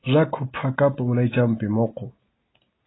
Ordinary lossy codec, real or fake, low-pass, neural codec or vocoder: AAC, 16 kbps; real; 7.2 kHz; none